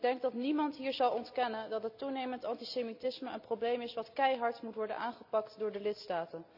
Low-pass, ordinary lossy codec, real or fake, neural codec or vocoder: 5.4 kHz; none; real; none